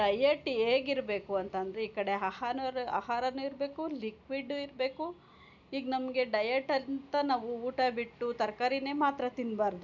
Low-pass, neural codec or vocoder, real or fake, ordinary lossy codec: 7.2 kHz; none; real; none